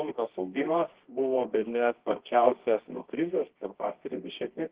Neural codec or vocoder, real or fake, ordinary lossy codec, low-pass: codec, 24 kHz, 0.9 kbps, WavTokenizer, medium music audio release; fake; Opus, 16 kbps; 3.6 kHz